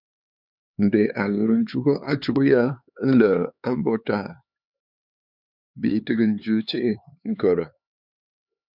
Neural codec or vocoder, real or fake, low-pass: codec, 16 kHz, 4 kbps, X-Codec, HuBERT features, trained on LibriSpeech; fake; 5.4 kHz